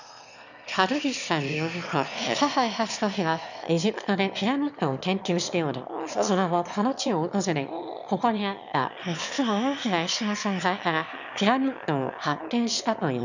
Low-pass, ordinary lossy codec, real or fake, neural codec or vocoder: 7.2 kHz; none; fake; autoencoder, 22.05 kHz, a latent of 192 numbers a frame, VITS, trained on one speaker